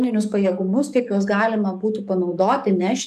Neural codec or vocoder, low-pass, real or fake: codec, 44.1 kHz, 7.8 kbps, DAC; 14.4 kHz; fake